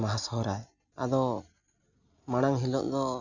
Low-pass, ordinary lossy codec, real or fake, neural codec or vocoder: 7.2 kHz; none; real; none